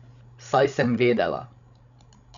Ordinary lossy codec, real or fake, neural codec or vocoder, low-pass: none; fake; codec, 16 kHz, 16 kbps, FreqCodec, larger model; 7.2 kHz